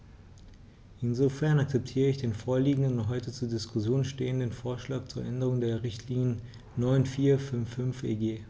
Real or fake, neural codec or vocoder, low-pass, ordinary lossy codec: real; none; none; none